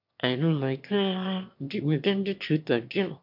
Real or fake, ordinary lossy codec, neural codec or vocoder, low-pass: fake; MP3, 48 kbps; autoencoder, 22.05 kHz, a latent of 192 numbers a frame, VITS, trained on one speaker; 5.4 kHz